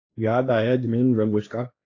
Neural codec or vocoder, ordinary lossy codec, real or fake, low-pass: codec, 16 kHz, 1.1 kbps, Voila-Tokenizer; AAC, 32 kbps; fake; 7.2 kHz